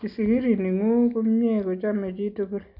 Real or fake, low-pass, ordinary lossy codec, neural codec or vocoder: real; 5.4 kHz; none; none